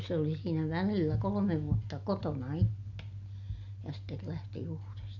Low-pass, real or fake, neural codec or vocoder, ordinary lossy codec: 7.2 kHz; real; none; none